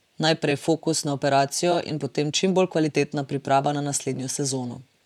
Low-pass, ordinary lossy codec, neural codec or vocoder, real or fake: 19.8 kHz; none; vocoder, 44.1 kHz, 128 mel bands, Pupu-Vocoder; fake